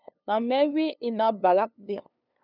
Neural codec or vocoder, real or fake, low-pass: codec, 16 kHz, 2 kbps, FunCodec, trained on LibriTTS, 25 frames a second; fake; 5.4 kHz